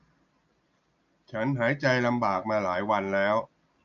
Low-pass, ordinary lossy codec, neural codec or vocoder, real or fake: 7.2 kHz; none; none; real